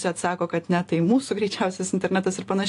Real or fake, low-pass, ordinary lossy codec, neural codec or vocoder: real; 10.8 kHz; AAC, 48 kbps; none